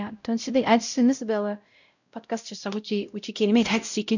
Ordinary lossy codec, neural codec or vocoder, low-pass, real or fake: none; codec, 16 kHz, 0.5 kbps, X-Codec, WavLM features, trained on Multilingual LibriSpeech; 7.2 kHz; fake